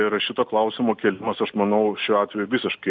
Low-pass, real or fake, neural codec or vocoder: 7.2 kHz; real; none